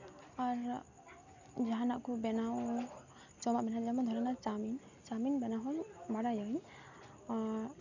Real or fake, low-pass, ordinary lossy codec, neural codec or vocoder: real; 7.2 kHz; none; none